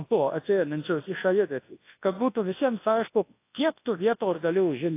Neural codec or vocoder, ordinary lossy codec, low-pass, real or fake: codec, 24 kHz, 0.9 kbps, WavTokenizer, large speech release; AAC, 24 kbps; 3.6 kHz; fake